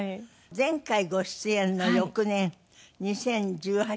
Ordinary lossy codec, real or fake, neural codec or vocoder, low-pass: none; real; none; none